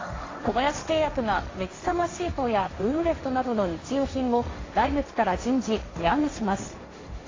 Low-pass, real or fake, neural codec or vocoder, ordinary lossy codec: 7.2 kHz; fake; codec, 16 kHz, 1.1 kbps, Voila-Tokenizer; AAC, 32 kbps